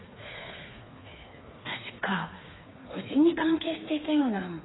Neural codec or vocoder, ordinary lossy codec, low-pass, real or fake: codec, 16 kHz, 4 kbps, FreqCodec, smaller model; AAC, 16 kbps; 7.2 kHz; fake